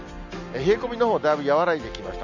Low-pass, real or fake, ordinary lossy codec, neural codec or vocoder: 7.2 kHz; real; none; none